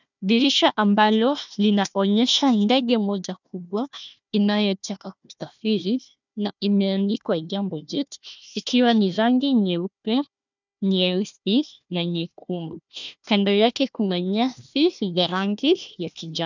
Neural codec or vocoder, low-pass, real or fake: codec, 16 kHz, 1 kbps, FunCodec, trained on Chinese and English, 50 frames a second; 7.2 kHz; fake